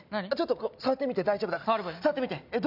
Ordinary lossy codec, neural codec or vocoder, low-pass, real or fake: none; none; 5.4 kHz; real